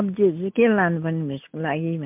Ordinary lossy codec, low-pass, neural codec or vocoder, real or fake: MP3, 32 kbps; 3.6 kHz; none; real